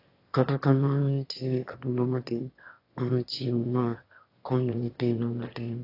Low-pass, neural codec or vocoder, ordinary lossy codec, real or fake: 5.4 kHz; autoencoder, 22.05 kHz, a latent of 192 numbers a frame, VITS, trained on one speaker; AAC, 32 kbps; fake